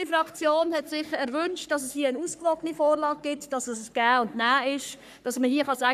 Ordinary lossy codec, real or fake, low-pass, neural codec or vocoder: none; fake; 14.4 kHz; codec, 44.1 kHz, 3.4 kbps, Pupu-Codec